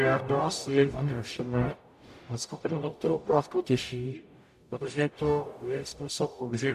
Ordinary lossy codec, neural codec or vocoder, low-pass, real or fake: AAC, 96 kbps; codec, 44.1 kHz, 0.9 kbps, DAC; 14.4 kHz; fake